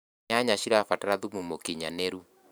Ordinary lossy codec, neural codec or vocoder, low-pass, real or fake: none; vocoder, 44.1 kHz, 128 mel bands every 512 samples, BigVGAN v2; none; fake